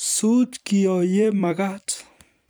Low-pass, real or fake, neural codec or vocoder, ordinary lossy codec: none; fake; vocoder, 44.1 kHz, 128 mel bands every 256 samples, BigVGAN v2; none